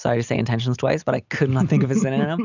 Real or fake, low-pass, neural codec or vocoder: real; 7.2 kHz; none